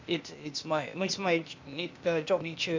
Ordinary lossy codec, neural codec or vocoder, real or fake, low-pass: MP3, 64 kbps; codec, 16 kHz, 0.8 kbps, ZipCodec; fake; 7.2 kHz